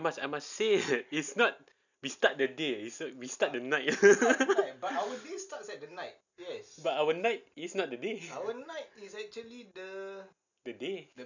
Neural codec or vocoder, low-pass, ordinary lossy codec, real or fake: none; 7.2 kHz; none; real